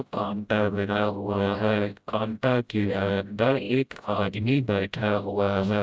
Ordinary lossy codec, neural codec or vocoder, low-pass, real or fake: none; codec, 16 kHz, 0.5 kbps, FreqCodec, smaller model; none; fake